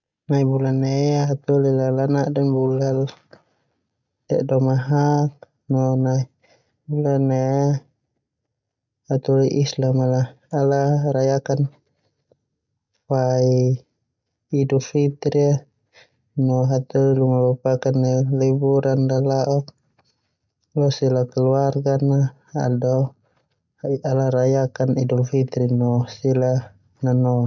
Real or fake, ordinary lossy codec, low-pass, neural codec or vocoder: real; none; 7.2 kHz; none